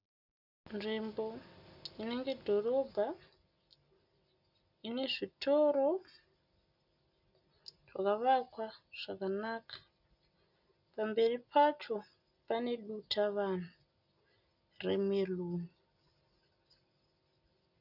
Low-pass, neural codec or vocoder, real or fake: 5.4 kHz; none; real